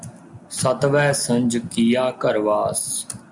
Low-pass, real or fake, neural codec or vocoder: 10.8 kHz; real; none